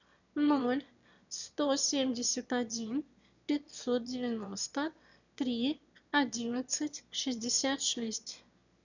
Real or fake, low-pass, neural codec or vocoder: fake; 7.2 kHz; autoencoder, 22.05 kHz, a latent of 192 numbers a frame, VITS, trained on one speaker